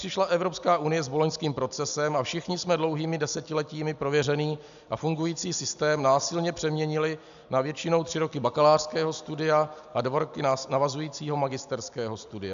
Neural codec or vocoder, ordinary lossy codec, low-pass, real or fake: none; AAC, 96 kbps; 7.2 kHz; real